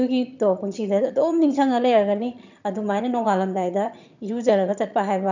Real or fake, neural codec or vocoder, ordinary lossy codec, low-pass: fake; vocoder, 22.05 kHz, 80 mel bands, HiFi-GAN; none; 7.2 kHz